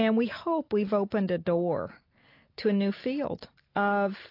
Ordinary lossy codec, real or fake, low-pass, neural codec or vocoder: AAC, 32 kbps; real; 5.4 kHz; none